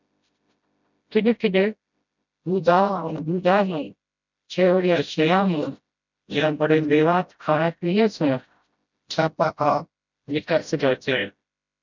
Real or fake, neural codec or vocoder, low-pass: fake; codec, 16 kHz, 0.5 kbps, FreqCodec, smaller model; 7.2 kHz